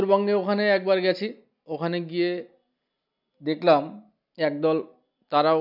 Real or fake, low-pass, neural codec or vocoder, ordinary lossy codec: real; 5.4 kHz; none; none